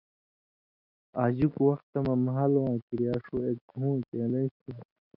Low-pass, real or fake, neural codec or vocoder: 5.4 kHz; real; none